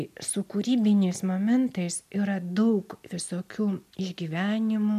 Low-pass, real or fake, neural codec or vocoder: 14.4 kHz; real; none